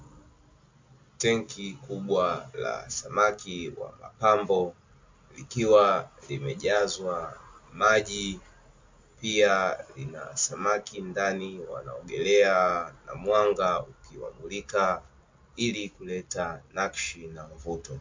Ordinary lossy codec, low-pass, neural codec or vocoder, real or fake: MP3, 48 kbps; 7.2 kHz; none; real